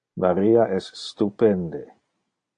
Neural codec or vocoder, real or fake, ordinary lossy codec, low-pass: none; real; AAC, 48 kbps; 9.9 kHz